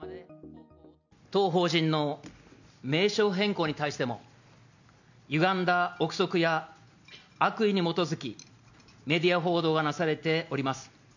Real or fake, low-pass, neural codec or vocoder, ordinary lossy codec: real; 7.2 kHz; none; none